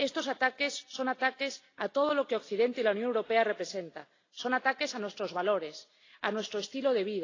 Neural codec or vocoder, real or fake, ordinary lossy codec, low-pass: none; real; AAC, 32 kbps; 7.2 kHz